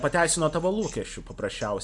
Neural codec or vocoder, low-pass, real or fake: none; 10.8 kHz; real